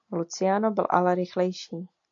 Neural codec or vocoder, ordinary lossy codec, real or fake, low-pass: none; AAC, 48 kbps; real; 7.2 kHz